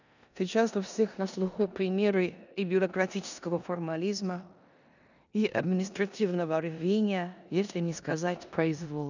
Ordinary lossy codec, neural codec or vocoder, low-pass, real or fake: none; codec, 16 kHz in and 24 kHz out, 0.9 kbps, LongCat-Audio-Codec, four codebook decoder; 7.2 kHz; fake